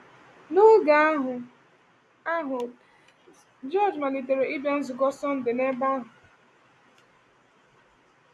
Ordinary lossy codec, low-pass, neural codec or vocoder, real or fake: none; none; none; real